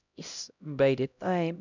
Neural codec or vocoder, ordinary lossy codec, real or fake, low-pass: codec, 16 kHz, 0.5 kbps, X-Codec, HuBERT features, trained on LibriSpeech; none; fake; 7.2 kHz